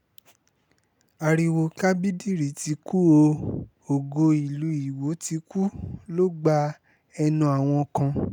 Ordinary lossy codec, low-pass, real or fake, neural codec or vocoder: none; 19.8 kHz; real; none